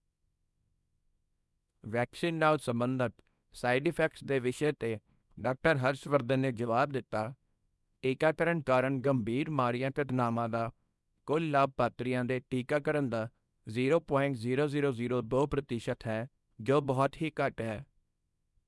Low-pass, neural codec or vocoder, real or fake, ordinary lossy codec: none; codec, 24 kHz, 0.9 kbps, WavTokenizer, small release; fake; none